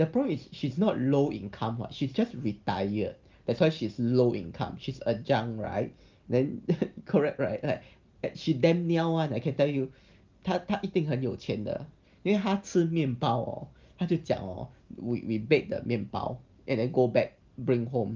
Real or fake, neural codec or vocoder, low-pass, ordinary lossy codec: real; none; 7.2 kHz; Opus, 32 kbps